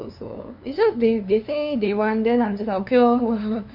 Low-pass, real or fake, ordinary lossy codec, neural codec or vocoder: 5.4 kHz; fake; none; codec, 16 kHz, 2 kbps, FunCodec, trained on LibriTTS, 25 frames a second